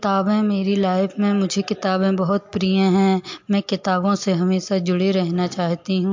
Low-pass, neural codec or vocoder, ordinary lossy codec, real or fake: 7.2 kHz; none; MP3, 48 kbps; real